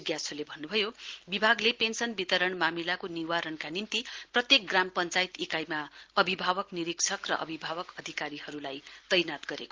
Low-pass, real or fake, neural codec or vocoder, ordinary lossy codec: 7.2 kHz; real; none; Opus, 16 kbps